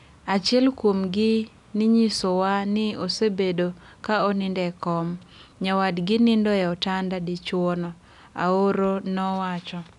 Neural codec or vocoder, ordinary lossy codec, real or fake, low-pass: none; none; real; 10.8 kHz